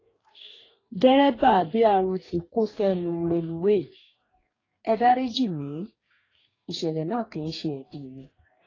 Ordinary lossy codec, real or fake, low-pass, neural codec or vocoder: AAC, 32 kbps; fake; 7.2 kHz; codec, 44.1 kHz, 2.6 kbps, DAC